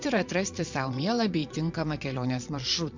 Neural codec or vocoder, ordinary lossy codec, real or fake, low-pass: vocoder, 22.05 kHz, 80 mel bands, Vocos; MP3, 64 kbps; fake; 7.2 kHz